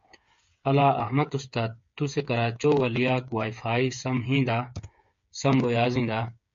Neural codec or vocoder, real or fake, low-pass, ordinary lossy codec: codec, 16 kHz, 8 kbps, FreqCodec, smaller model; fake; 7.2 kHz; MP3, 48 kbps